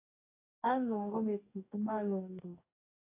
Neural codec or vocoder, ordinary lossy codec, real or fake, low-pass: codec, 44.1 kHz, 2.6 kbps, DAC; AAC, 24 kbps; fake; 3.6 kHz